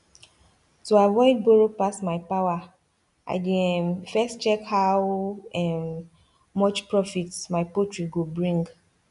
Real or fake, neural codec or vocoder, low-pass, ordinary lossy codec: real; none; 10.8 kHz; none